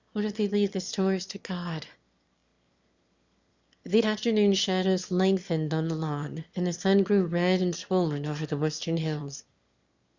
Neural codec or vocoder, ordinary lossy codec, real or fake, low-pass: autoencoder, 22.05 kHz, a latent of 192 numbers a frame, VITS, trained on one speaker; Opus, 64 kbps; fake; 7.2 kHz